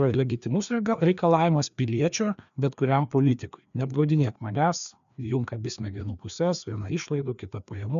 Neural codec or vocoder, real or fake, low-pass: codec, 16 kHz, 2 kbps, FreqCodec, larger model; fake; 7.2 kHz